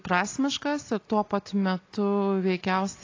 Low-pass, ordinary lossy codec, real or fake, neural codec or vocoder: 7.2 kHz; AAC, 32 kbps; real; none